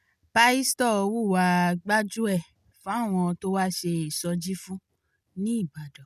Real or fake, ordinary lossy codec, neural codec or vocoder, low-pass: real; none; none; 14.4 kHz